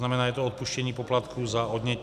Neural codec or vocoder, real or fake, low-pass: none; real; 14.4 kHz